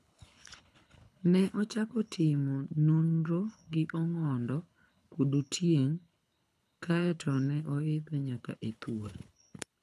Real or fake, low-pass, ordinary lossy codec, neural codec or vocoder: fake; none; none; codec, 24 kHz, 6 kbps, HILCodec